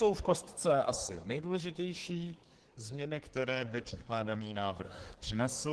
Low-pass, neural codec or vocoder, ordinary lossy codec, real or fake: 10.8 kHz; codec, 24 kHz, 1 kbps, SNAC; Opus, 16 kbps; fake